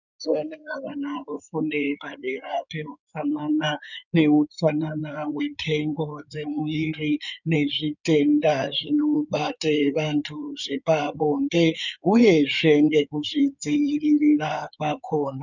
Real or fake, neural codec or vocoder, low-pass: fake; codec, 16 kHz in and 24 kHz out, 2.2 kbps, FireRedTTS-2 codec; 7.2 kHz